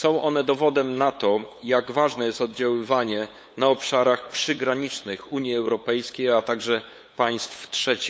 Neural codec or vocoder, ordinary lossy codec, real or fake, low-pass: codec, 16 kHz, 8 kbps, FunCodec, trained on LibriTTS, 25 frames a second; none; fake; none